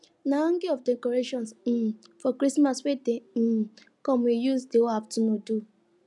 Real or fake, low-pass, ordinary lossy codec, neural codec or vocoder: real; 10.8 kHz; none; none